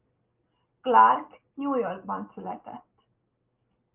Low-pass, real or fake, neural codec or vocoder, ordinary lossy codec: 3.6 kHz; real; none; Opus, 24 kbps